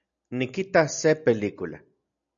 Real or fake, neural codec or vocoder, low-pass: real; none; 7.2 kHz